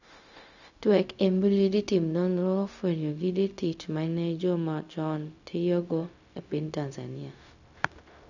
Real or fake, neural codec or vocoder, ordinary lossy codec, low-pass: fake; codec, 16 kHz, 0.4 kbps, LongCat-Audio-Codec; none; 7.2 kHz